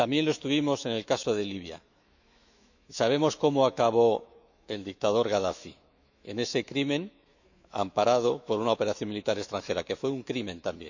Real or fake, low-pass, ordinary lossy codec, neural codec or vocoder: fake; 7.2 kHz; none; autoencoder, 48 kHz, 128 numbers a frame, DAC-VAE, trained on Japanese speech